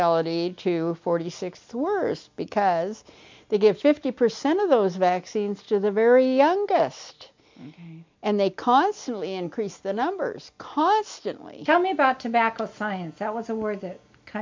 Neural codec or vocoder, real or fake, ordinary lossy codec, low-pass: none; real; MP3, 64 kbps; 7.2 kHz